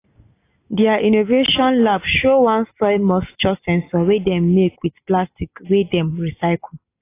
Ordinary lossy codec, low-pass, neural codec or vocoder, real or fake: AAC, 24 kbps; 3.6 kHz; none; real